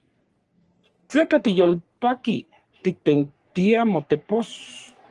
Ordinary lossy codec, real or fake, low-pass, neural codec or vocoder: Opus, 24 kbps; fake; 10.8 kHz; codec, 44.1 kHz, 3.4 kbps, Pupu-Codec